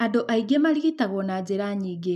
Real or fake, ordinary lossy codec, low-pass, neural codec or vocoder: real; none; 14.4 kHz; none